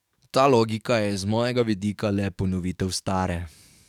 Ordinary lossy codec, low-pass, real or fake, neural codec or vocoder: none; 19.8 kHz; fake; codec, 44.1 kHz, 7.8 kbps, DAC